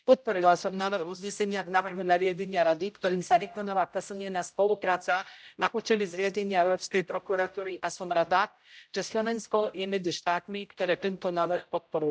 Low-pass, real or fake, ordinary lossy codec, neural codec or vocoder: none; fake; none; codec, 16 kHz, 0.5 kbps, X-Codec, HuBERT features, trained on general audio